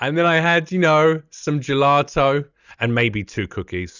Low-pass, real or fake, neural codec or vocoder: 7.2 kHz; real; none